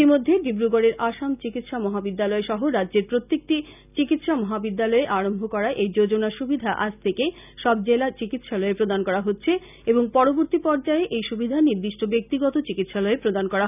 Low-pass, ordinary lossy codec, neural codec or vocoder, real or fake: 3.6 kHz; none; none; real